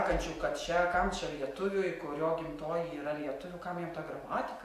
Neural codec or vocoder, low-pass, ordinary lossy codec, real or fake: none; 14.4 kHz; AAC, 64 kbps; real